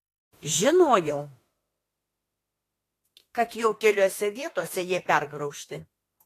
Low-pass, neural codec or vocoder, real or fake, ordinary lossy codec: 14.4 kHz; autoencoder, 48 kHz, 32 numbers a frame, DAC-VAE, trained on Japanese speech; fake; AAC, 48 kbps